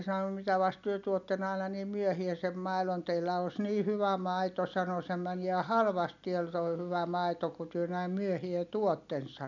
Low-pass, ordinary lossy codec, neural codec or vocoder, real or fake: 7.2 kHz; none; none; real